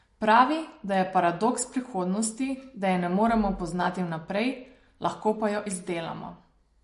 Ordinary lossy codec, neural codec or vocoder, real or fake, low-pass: MP3, 48 kbps; none; real; 10.8 kHz